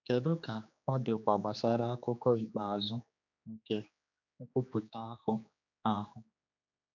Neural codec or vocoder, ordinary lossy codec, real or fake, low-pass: codec, 16 kHz, 2 kbps, X-Codec, HuBERT features, trained on general audio; none; fake; 7.2 kHz